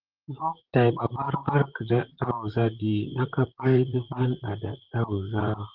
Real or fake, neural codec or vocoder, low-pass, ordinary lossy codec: fake; vocoder, 44.1 kHz, 128 mel bands, Pupu-Vocoder; 5.4 kHz; Opus, 16 kbps